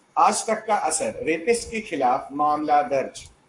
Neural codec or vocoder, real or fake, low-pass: codec, 44.1 kHz, 7.8 kbps, Pupu-Codec; fake; 10.8 kHz